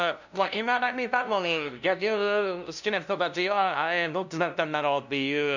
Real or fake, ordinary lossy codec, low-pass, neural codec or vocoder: fake; none; 7.2 kHz; codec, 16 kHz, 0.5 kbps, FunCodec, trained on LibriTTS, 25 frames a second